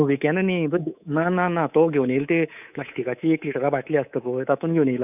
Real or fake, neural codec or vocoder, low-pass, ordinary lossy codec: fake; codec, 24 kHz, 3.1 kbps, DualCodec; 3.6 kHz; none